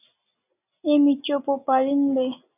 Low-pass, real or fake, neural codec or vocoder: 3.6 kHz; real; none